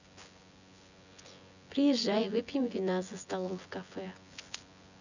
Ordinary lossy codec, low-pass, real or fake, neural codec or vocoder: none; 7.2 kHz; fake; vocoder, 24 kHz, 100 mel bands, Vocos